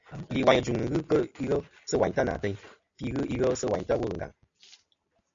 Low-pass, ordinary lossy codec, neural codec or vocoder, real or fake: 7.2 kHz; MP3, 64 kbps; none; real